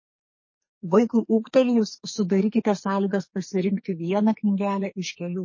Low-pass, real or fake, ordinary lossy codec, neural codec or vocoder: 7.2 kHz; fake; MP3, 32 kbps; codec, 44.1 kHz, 2.6 kbps, SNAC